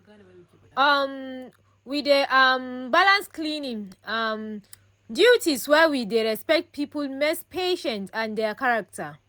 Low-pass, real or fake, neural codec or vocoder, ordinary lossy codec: none; real; none; none